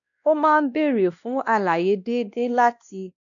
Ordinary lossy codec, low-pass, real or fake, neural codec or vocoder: none; 7.2 kHz; fake; codec, 16 kHz, 1 kbps, X-Codec, WavLM features, trained on Multilingual LibriSpeech